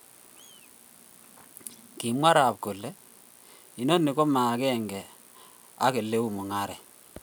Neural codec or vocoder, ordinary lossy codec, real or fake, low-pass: none; none; real; none